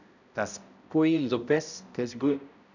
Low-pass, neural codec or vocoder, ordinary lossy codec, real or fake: 7.2 kHz; codec, 16 kHz, 0.5 kbps, X-Codec, HuBERT features, trained on balanced general audio; none; fake